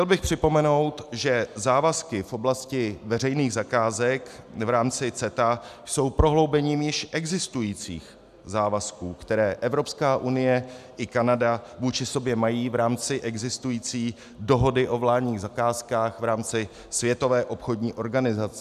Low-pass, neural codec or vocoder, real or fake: 14.4 kHz; none; real